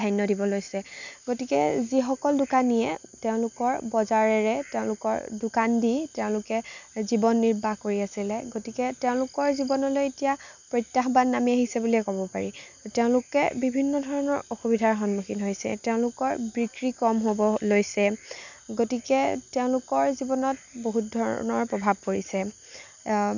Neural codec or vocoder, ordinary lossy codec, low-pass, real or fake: none; none; 7.2 kHz; real